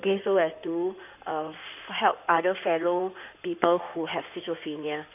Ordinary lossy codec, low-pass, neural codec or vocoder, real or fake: none; 3.6 kHz; codec, 16 kHz in and 24 kHz out, 2.2 kbps, FireRedTTS-2 codec; fake